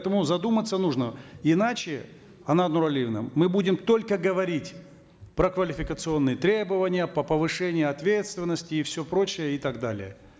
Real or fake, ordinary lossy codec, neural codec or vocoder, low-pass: real; none; none; none